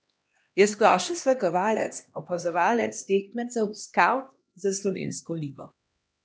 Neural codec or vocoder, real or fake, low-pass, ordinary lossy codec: codec, 16 kHz, 1 kbps, X-Codec, HuBERT features, trained on LibriSpeech; fake; none; none